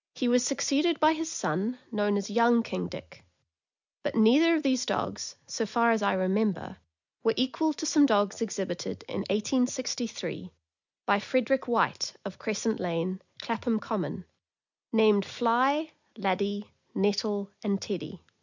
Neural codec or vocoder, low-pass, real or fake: none; 7.2 kHz; real